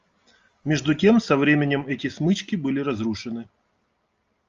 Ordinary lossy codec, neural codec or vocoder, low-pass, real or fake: Opus, 32 kbps; none; 7.2 kHz; real